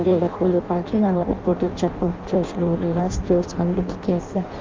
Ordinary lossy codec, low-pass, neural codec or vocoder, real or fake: Opus, 24 kbps; 7.2 kHz; codec, 16 kHz in and 24 kHz out, 0.6 kbps, FireRedTTS-2 codec; fake